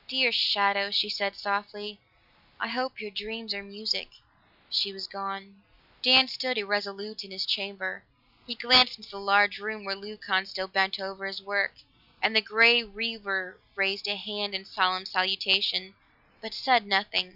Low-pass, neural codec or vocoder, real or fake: 5.4 kHz; none; real